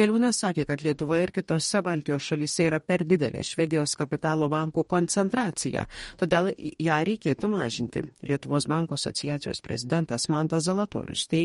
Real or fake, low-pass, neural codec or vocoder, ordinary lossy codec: fake; 19.8 kHz; codec, 44.1 kHz, 2.6 kbps, DAC; MP3, 48 kbps